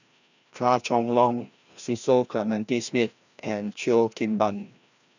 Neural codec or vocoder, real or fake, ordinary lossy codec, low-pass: codec, 16 kHz, 1 kbps, FreqCodec, larger model; fake; none; 7.2 kHz